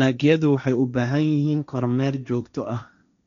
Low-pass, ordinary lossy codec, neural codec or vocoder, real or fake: 7.2 kHz; none; codec, 16 kHz, 1.1 kbps, Voila-Tokenizer; fake